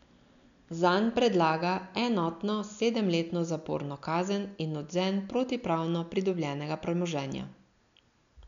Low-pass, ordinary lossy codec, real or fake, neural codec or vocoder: 7.2 kHz; none; real; none